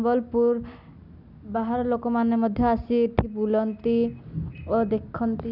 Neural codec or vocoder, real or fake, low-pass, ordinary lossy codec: none; real; 5.4 kHz; none